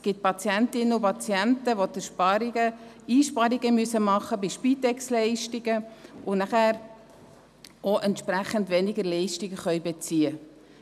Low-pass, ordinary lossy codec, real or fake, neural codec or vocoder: 14.4 kHz; none; real; none